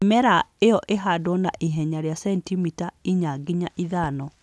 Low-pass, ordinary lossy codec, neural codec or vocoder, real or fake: none; none; none; real